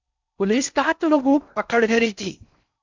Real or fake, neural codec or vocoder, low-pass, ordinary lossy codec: fake; codec, 16 kHz in and 24 kHz out, 0.6 kbps, FocalCodec, streaming, 4096 codes; 7.2 kHz; MP3, 64 kbps